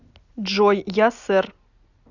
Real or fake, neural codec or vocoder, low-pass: real; none; 7.2 kHz